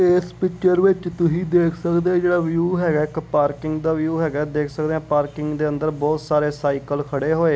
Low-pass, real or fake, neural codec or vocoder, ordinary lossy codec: none; real; none; none